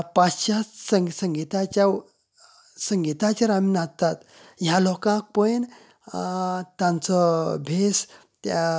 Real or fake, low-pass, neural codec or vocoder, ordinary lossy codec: real; none; none; none